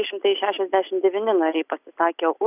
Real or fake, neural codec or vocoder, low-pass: real; none; 3.6 kHz